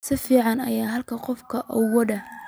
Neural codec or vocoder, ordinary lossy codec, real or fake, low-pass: none; none; real; none